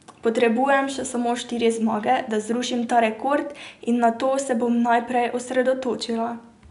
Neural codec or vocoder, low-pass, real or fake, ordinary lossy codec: none; 10.8 kHz; real; none